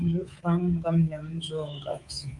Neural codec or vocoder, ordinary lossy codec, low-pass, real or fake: codec, 24 kHz, 3.1 kbps, DualCodec; Opus, 32 kbps; 10.8 kHz; fake